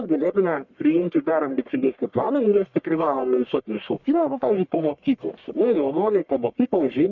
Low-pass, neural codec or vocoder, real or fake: 7.2 kHz; codec, 44.1 kHz, 1.7 kbps, Pupu-Codec; fake